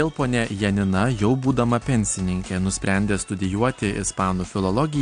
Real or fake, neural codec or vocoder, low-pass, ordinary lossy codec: real; none; 9.9 kHz; AAC, 64 kbps